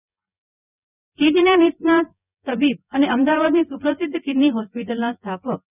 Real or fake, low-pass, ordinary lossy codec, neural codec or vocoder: fake; 3.6 kHz; none; vocoder, 24 kHz, 100 mel bands, Vocos